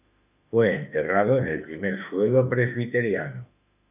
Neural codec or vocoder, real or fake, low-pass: autoencoder, 48 kHz, 32 numbers a frame, DAC-VAE, trained on Japanese speech; fake; 3.6 kHz